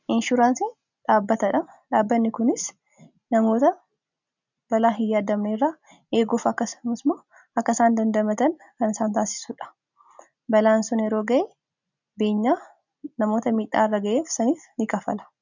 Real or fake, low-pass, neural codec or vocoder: real; 7.2 kHz; none